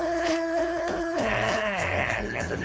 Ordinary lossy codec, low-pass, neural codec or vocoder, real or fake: none; none; codec, 16 kHz, 4.8 kbps, FACodec; fake